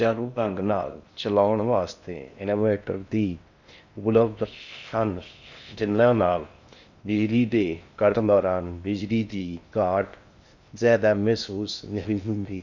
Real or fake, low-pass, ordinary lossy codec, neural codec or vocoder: fake; 7.2 kHz; none; codec, 16 kHz in and 24 kHz out, 0.6 kbps, FocalCodec, streaming, 4096 codes